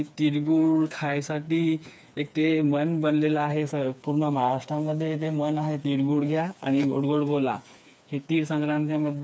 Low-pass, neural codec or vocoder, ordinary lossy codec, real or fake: none; codec, 16 kHz, 4 kbps, FreqCodec, smaller model; none; fake